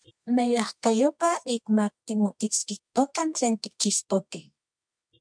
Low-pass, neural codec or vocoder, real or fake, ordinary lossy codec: 9.9 kHz; codec, 24 kHz, 0.9 kbps, WavTokenizer, medium music audio release; fake; MP3, 64 kbps